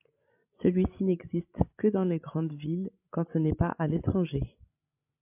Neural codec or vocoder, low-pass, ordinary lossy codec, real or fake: codec, 16 kHz, 16 kbps, FreqCodec, larger model; 3.6 kHz; MP3, 32 kbps; fake